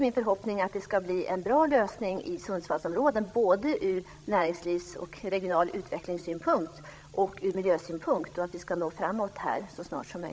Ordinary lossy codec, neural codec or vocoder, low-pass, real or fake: none; codec, 16 kHz, 16 kbps, FreqCodec, larger model; none; fake